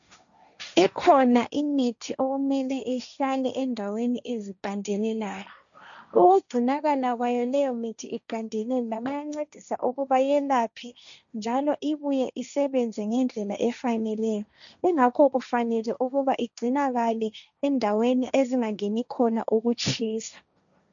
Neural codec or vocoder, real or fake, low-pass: codec, 16 kHz, 1.1 kbps, Voila-Tokenizer; fake; 7.2 kHz